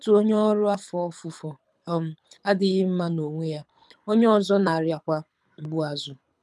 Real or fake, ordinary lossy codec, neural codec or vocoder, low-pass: fake; none; codec, 24 kHz, 6 kbps, HILCodec; none